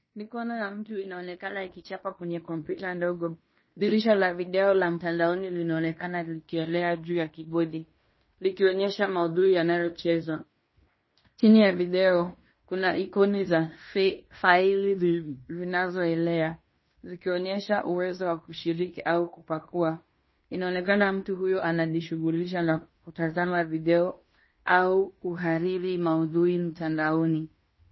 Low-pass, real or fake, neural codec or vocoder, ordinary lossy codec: 7.2 kHz; fake; codec, 16 kHz in and 24 kHz out, 0.9 kbps, LongCat-Audio-Codec, fine tuned four codebook decoder; MP3, 24 kbps